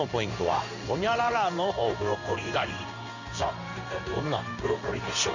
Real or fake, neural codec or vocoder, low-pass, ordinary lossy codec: fake; codec, 16 kHz in and 24 kHz out, 1 kbps, XY-Tokenizer; 7.2 kHz; none